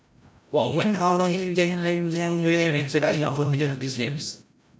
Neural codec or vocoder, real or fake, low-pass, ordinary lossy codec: codec, 16 kHz, 0.5 kbps, FreqCodec, larger model; fake; none; none